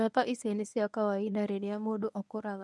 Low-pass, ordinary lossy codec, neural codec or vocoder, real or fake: none; none; codec, 24 kHz, 0.9 kbps, WavTokenizer, medium speech release version 1; fake